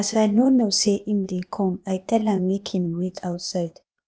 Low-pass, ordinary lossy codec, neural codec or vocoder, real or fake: none; none; codec, 16 kHz, 0.8 kbps, ZipCodec; fake